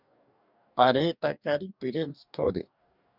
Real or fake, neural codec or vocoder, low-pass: fake; codec, 44.1 kHz, 2.6 kbps, DAC; 5.4 kHz